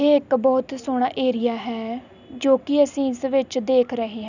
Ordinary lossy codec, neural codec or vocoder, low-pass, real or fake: none; none; 7.2 kHz; real